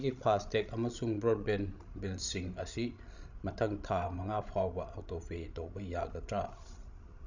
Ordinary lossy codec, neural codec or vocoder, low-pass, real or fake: none; codec, 16 kHz, 16 kbps, FreqCodec, larger model; 7.2 kHz; fake